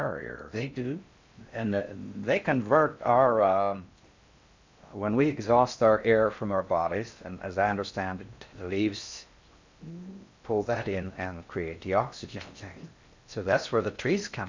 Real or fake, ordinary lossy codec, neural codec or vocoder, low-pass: fake; MP3, 64 kbps; codec, 16 kHz in and 24 kHz out, 0.6 kbps, FocalCodec, streaming, 4096 codes; 7.2 kHz